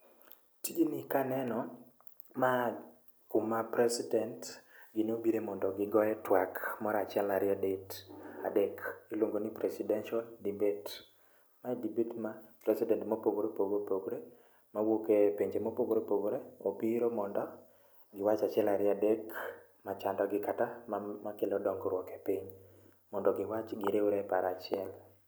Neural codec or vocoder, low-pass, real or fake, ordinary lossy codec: none; none; real; none